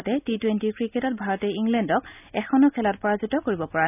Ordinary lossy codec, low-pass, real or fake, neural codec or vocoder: none; 3.6 kHz; real; none